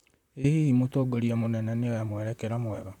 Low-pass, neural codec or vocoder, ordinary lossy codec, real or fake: 19.8 kHz; vocoder, 44.1 kHz, 128 mel bands, Pupu-Vocoder; none; fake